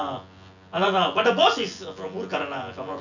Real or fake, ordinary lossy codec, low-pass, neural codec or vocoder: fake; none; 7.2 kHz; vocoder, 24 kHz, 100 mel bands, Vocos